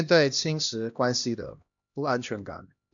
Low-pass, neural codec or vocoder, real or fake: 7.2 kHz; codec, 16 kHz, 1 kbps, X-Codec, HuBERT features, trained on LibriSpeech; fake